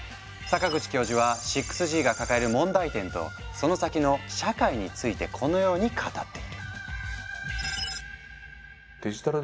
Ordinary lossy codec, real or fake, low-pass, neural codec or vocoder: none; real; none; none